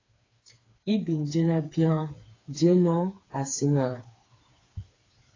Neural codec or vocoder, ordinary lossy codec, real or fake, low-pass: codec, 16 kHz, 4 kbps, FreqCodec, smaller model; AAC, 32 kbps; fake; 7.2 kHz